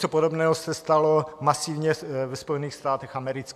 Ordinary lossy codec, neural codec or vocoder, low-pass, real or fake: AAC, 96 kbps; none; 14.4 kHz; real